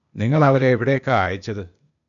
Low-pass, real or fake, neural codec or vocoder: 7.2 kHz; fake; codec, 16 kHz, 0.8 kbps, ZipCodec